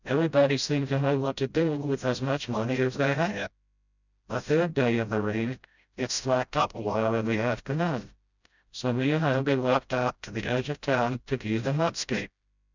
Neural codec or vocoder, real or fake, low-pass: codec, 16 kHz, 0.5 kbps, FreqCodec, smaller model; fake; 7.2 kHz